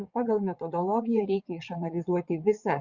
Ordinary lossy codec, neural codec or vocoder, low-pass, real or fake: Opus, 64 kbps; vocoder, 22.05 kHz, 80 mel bands, WaveNeXt; 7.2 kHz; fake